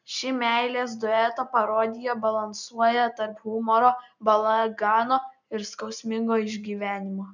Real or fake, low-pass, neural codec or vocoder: real; 7.2 kHz; none